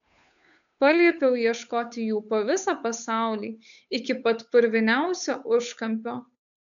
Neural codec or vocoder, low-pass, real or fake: codec, 16 kHz, 8 kbps, FunCodec, trained on Chinese and English, 25 frames a second; 7.2 kHz; fake